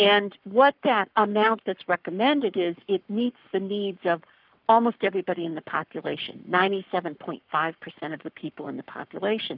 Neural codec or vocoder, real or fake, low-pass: codec, 44.1 kHz, 7.8 kbps, Pupu-Codec; fake; 5.4 kHz